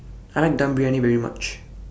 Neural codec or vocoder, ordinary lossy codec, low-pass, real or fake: none; none; none; real